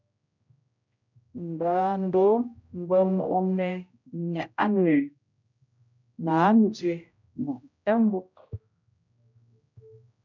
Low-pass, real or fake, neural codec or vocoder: 7.2 kHz; fake; codec, 16 kHz, 0.5 kbps, X-Codec, HuBERT features, trained on general audio